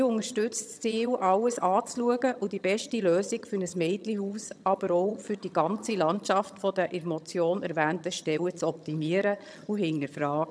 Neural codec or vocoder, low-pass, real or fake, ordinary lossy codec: vocoder, 22.05 kHz, 80 mel bands, HiFi-GAN; none; fake; none